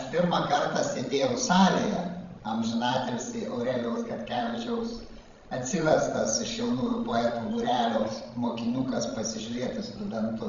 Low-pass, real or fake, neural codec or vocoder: 7.2 kHz; fake; codec, 16 kHz, 16 kbps, FreqCodec, larger model